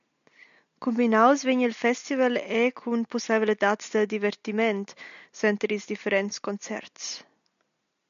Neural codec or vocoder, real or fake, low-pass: none; real; 7.2 kHz